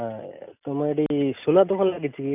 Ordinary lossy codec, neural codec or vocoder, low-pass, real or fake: none; none; 3.6 kHz; real